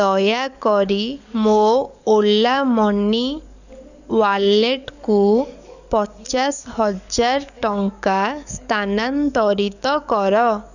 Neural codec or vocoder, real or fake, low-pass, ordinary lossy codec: codec, 44.1 kHz, 7.8 kbps, DAC; fake; 7.2 kHz; none